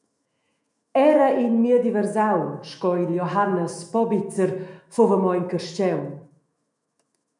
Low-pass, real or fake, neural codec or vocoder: 10.8 kHz; fake; autoencoder, 48 kHz, 128 numbers a frame, DAC-VAE, trained on Japanese speech